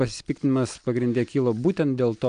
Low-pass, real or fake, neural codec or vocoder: 9.9 kHz; real; none